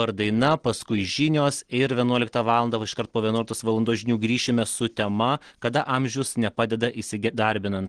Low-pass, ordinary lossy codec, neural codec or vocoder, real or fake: 9.9 kHz; Opus, 16 kbps; none; real